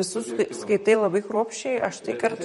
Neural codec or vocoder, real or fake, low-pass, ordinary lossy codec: vocoder, 44.1 kHz, 128 mel bands, Pupu-Vocoder; fake; 19.8 kHz; MP3, 48 kbps